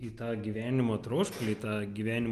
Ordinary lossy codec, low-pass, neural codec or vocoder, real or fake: Opus, 32 kbps; 14.4 kHz; none; real